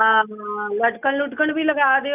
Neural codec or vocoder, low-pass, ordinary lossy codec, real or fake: none; 3.6 kHz; none; real